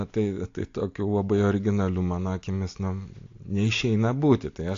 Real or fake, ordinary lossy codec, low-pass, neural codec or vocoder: real; AAC, 48 kbps; 7.2 kHz; none